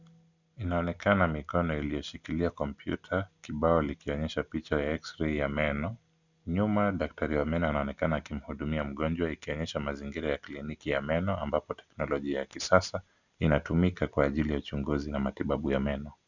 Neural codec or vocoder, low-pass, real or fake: none; 7.2 kHz; real